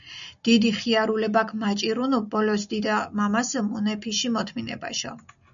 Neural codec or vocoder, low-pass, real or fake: none; 7.2 kHz; real